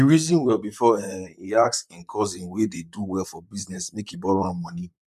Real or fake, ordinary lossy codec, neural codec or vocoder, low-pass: fake; none; vocoder, 44.1 kHz, 128 mel bands, Pupu-Vocoder; 14.4 kHz